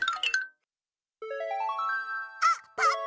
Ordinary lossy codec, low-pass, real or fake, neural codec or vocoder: none; none; real; none